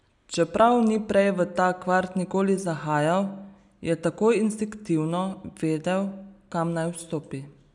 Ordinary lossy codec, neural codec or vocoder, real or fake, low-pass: none; none; real; 10.8 kHz